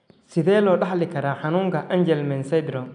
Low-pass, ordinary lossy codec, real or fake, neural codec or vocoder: 9.9 kHz; none; real; none